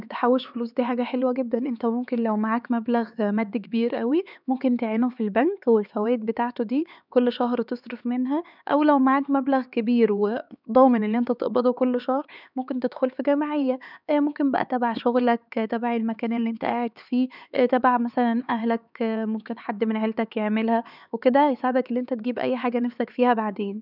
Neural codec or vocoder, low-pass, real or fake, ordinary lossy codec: codec, 16 kHz, 4 kbps, X-Codec, HuBERT features, trained on LibriSpeech; 5.4 kHz; fake; none